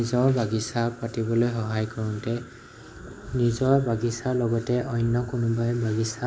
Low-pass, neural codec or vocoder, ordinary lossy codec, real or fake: none; none; none; real